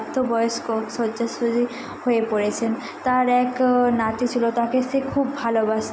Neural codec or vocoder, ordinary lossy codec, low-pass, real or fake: none; none; none; real